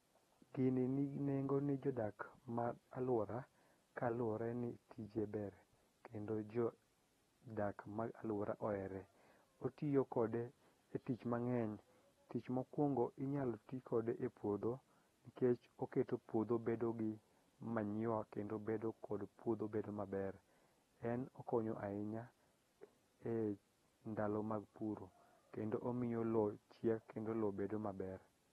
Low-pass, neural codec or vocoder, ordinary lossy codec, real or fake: 14.4 kHz; none; AAC, 32 kbps; real